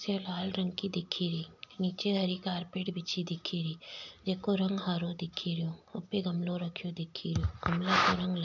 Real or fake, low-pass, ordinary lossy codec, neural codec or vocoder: real; 7.2 kHz; none; none